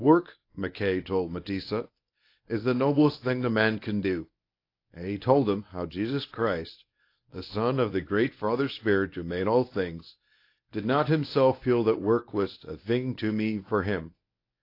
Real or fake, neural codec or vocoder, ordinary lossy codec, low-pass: fake; codec, 24 kHz, 0.9 kbps, WavTokenizer, medium speech release version 1; AAC, 32 kbps; 5.4 kHz